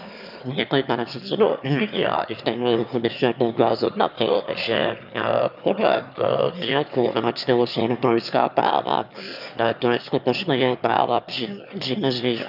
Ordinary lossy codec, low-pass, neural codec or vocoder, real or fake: none; 5.4 kHz; autoencoder, 22.05 kHz, a latent of 192 numbers a frame, VITS, trained on one speaker; fake